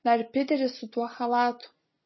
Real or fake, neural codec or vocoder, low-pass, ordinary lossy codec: real; none; 7.2 kHz; MP3, 24 kbps